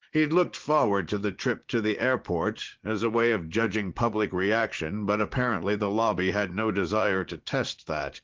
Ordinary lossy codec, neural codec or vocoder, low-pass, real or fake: Opus, 16 kbps; vocoder, 44.1 kHz, 80 mel bands, Vocos; 7.2 kHz; fake